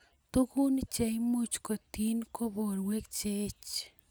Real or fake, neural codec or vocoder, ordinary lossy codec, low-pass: real; none; none; none